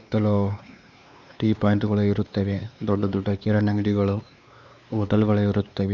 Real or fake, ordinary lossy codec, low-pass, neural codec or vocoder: fake; none; 7.2 kHz; codec, 16 kHz, 2 kbps, X-Codec, WavLM features, trained on Multilingual LibriSpeech